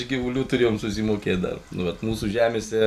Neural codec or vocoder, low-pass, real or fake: none; 14.4 kHz; real